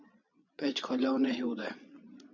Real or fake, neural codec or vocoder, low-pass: fake; vocoder, 22.05 kHz, 80 mel bands, Vocos; 7.2 kHz